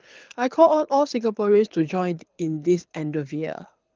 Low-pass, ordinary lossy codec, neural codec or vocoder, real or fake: 7.2 kHz; Opus, 32 kbps; codec, 24 kHz, 6 kbps, HILCodec; fake